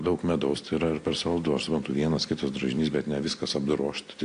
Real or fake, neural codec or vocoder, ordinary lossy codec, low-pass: real; none; AAC, 48 kbps; 9.9 kHz